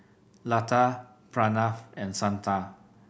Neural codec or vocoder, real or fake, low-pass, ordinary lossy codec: none; real; none; none